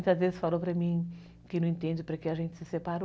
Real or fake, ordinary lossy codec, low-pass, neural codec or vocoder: real; none; none; none